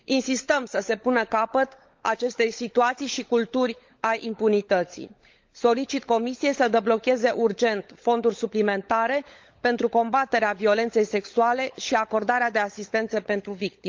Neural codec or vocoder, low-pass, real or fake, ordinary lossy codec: codec, 16 kHz, 16 kbps, FunCodec, trained on LibriTTS, 50 frames a second; 7.2 kHz; fake; Opus, 32 kbps